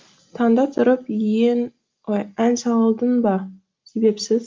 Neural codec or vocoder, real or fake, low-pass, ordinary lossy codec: none; real; 7.2 kHz; Opus, 32 kbps